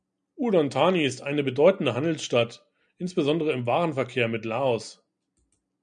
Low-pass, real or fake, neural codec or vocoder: 9.9 kHz; real; none